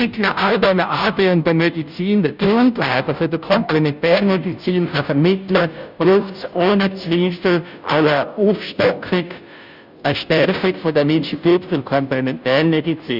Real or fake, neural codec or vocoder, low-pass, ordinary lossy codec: fake; codec, 16 kHz, 0.5 kbps, FunCodec, trained on Chinese and English, 25 frames a second; 5.4 kHz; none